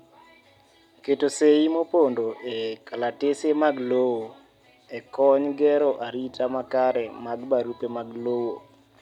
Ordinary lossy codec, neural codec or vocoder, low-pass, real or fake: none; none; 19.8 kHz; real